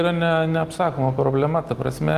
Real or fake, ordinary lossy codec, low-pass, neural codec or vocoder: real; Opus, 24 kbps; 14.4 kHz; none